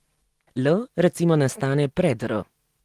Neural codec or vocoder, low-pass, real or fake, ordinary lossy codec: none; 14.4 kHz; real; Opus, 24 kbps